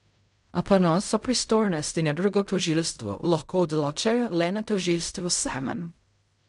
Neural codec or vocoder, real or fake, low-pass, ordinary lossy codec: codec, 16 kHz in and 24 kHz out, 0.4 kbps, LongCat-Audio-Codec, fine tuned four codebook decoder; fake; 10.8 kHz; none